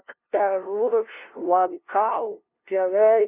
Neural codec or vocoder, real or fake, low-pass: codec, 16 kHz, 0.5 kbps, FunCodec, trained on LibriTTS, 25 frames a second; fake; 3.6 kHz